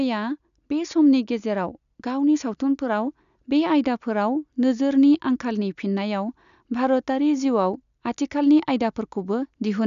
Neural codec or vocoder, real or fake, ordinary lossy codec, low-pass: none; real; none; 7.2 kHz